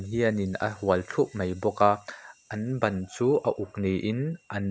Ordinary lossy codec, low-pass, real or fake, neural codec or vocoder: none; none; real; none